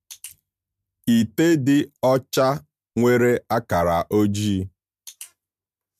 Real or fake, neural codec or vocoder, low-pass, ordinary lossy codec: real; none; 14.4 kHz; MP3, 96 kbps